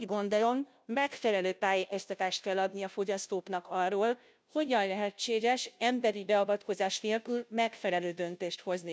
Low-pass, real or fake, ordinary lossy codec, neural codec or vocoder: none; fake; none; codec, 16 kHz, 0.5 kbps, FunCodec, trained on Chinese and English, 25 frames a second